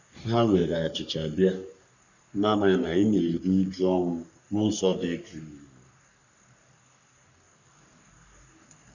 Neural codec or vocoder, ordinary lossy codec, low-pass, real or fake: codec, 44.1 kHz, 3.4 kbps, Pupu-Codec; none; 7.2 kHz; fake